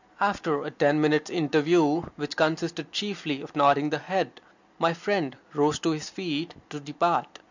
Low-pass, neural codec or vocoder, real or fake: 7.2 kHz; none; real